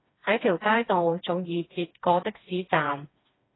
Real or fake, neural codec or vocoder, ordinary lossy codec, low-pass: fake; codec, 16 kHz, 2 kbps, FreqCodec, smaller model; AAC, 16 kbps; 7.2 kHz